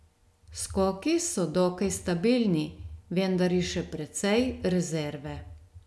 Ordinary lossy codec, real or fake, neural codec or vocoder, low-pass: none; real; none; none